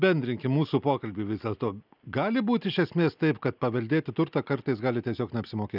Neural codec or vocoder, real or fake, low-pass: none; real; 5.4 kHz